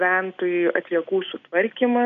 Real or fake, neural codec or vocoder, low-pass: real; none; 7.2 kHz